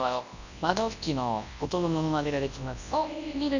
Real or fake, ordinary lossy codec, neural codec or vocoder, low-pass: fake; none; codec, 24 kHz, 0.9 kbps, WavTokenizer, large speech release; 7.2 kHz